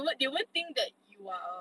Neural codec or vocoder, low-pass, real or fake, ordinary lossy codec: none; none; real; none